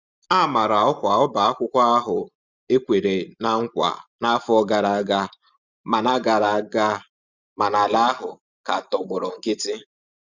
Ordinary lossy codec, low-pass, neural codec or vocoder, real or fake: Opus, 64 kbps; 7.2 kHz; none; real